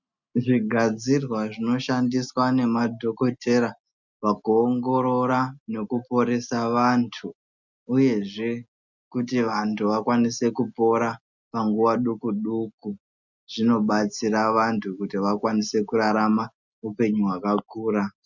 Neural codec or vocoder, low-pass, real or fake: none; 7.2 kHz; real